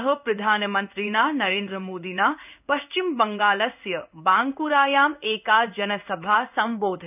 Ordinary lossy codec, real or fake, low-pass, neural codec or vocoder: none; fake; 3.6 kHz; codec, 16 kHz in and 24 kHz out, 1 kbps, XY-Tokenizer